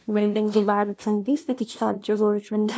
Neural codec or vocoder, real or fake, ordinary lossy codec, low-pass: codec, 16 kHz, 0.5 kbps, FunCodec, trained on LibriTTS, 25 frames a second; fake; none; none